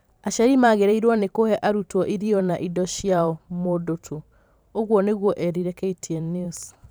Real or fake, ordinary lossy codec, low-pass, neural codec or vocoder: fake; none; none; vocoder, 44.1 kHz, 128 mel bands every 512 samples, BigVGAN v2